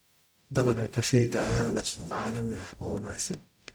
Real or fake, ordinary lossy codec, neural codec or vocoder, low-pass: fake; none; codec, 44.1 kHz, 0.9 kbps, DAC; none